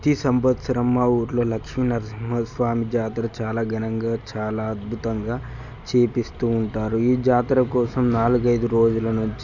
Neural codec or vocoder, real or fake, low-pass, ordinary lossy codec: none; real; 7.2 kHz; none